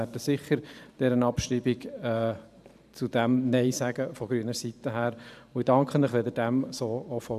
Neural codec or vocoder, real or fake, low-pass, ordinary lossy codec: vocoder, 44.1 kHz, 128 mel bands every 512 samples, BigVGAN v2; fake; 14.4 kHz; none